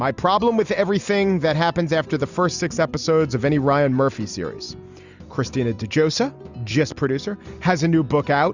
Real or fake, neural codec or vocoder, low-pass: real; none; 7.2 kHz